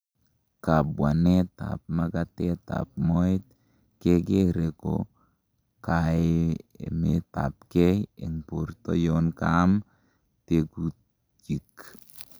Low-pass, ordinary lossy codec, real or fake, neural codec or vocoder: none; none; real; none